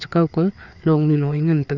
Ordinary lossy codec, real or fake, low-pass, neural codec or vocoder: none; fake; 7.2 kHz; codec, 16 kHz, 4 kbps, FreqCodec, larger model